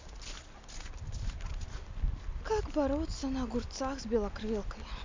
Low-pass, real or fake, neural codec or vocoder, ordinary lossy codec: 7.2 kHz; real; none; MP3, 64 kbps